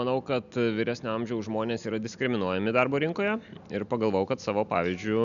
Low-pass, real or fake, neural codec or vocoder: 7.2 kHz; real; none